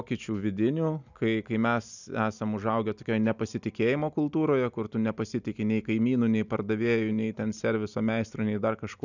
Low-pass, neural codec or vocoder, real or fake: 7.2 kHz; none; real